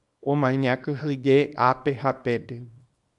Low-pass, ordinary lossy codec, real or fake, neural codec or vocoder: 10.8 kHz; MP3, 96 kbps; fake; codec, 24 kHz, 0.9 kbps, WavTokenizer, small release